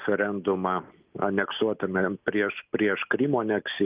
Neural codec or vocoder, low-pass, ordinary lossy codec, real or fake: none; 3.6 kHz; Opus, 24 kbps; real